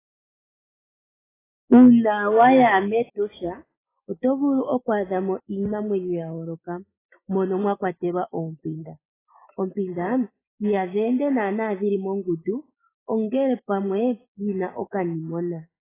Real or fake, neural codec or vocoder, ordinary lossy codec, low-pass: real; none; AAC, 16 kbps; 3.6 kHz